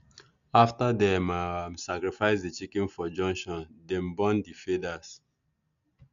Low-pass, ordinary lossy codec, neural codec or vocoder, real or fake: 7.2 kHz; none; none; real